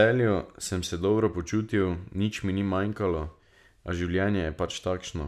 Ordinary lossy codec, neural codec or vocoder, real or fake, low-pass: none; none; real; 14.4 kHz